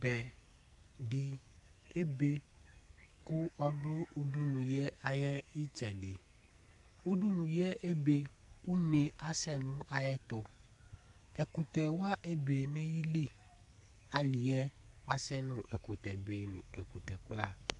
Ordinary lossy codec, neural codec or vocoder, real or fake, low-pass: AAC, 64 kbps; codec, 44.1 kHz, 2.6 kbps, SNAC; fake; 10.8 kHz